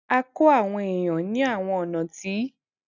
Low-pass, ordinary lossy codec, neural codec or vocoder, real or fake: 7.2 kHz; none; none; real